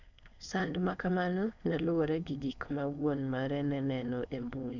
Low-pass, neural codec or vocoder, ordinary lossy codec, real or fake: 7.2 kHz; codec, 16 kHz, 4.8 kbps, FACodec; none; fake